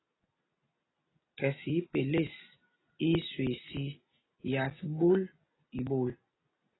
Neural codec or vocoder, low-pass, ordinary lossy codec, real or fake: none; 7.2 kHz; AAC, 16 kbps; real